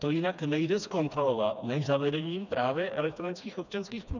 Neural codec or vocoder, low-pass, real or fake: codec, 16 kHz, 2 kbps, FreqCodec, smaller model; 7.2 kHz; fake